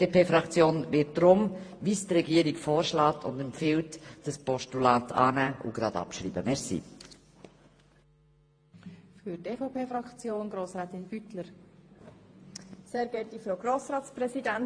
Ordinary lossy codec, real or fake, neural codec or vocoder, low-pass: AAC, 48 kbps; fake; vocoder, 48 kHz, 128 mel bands, Vocos; 9.9 kHz